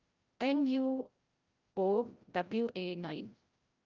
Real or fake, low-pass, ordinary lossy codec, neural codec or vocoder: fake; 7.2 kHz; Opus, 32 kbps; codec, 16 kHz, 0.5 kbps, FreqCodec, larger model